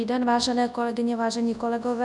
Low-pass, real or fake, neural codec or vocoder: 10.8 kHz; fake; codec, 24 kHz, 0.9 kbps, WavTokenizer, large speech release